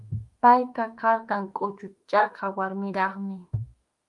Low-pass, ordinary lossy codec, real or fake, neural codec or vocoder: 10.8 kHz; Opus, 32 kbps; fake; autoencoder, 48 kHz, 32 numbers a frame, DAC-VAE, trained on Japanese speech